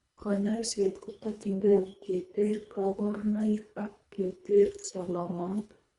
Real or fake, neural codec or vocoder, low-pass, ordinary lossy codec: fake; codec, 24 kHz, 1.5 kbps, HILCodec; 10.8 kHz; Opus, 64 kbps